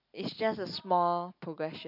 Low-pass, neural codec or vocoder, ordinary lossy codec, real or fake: 5.4 kHz; none; none; real